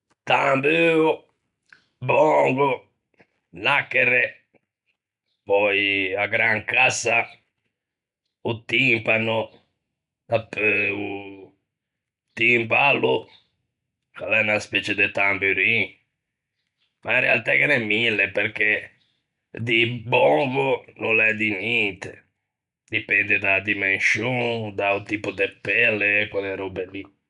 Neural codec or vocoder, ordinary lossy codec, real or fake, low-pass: none; none; real; 10.8 kHz